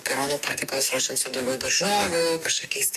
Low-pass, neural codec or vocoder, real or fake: 14.4 kHz; codec, 44.1 kHz, 2.6 kbps, DAC; fake